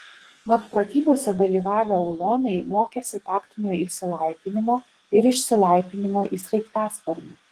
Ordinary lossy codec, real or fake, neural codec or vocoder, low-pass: Opus, 24 kbps; fake; codec, 32 kHz, 1.9 kbps, SNAC; 14.4 kHz